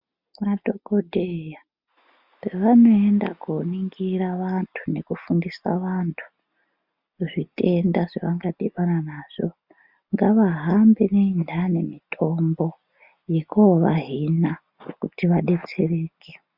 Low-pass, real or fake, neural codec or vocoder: 5.4 kHz; real; none